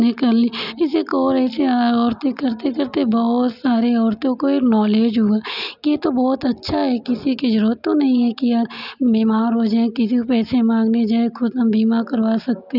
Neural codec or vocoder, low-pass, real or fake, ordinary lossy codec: none; 5.4 kHz; real; none